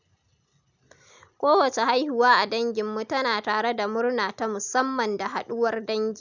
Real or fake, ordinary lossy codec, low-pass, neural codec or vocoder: real; none; 7.2 kHz; none